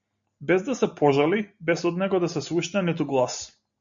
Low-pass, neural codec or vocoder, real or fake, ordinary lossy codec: 7.2 kHz; none; real; MP3, 64 kbps